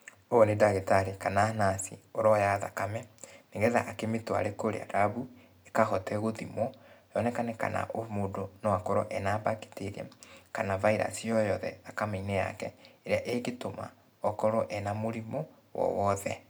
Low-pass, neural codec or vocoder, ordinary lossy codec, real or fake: none; none; none; real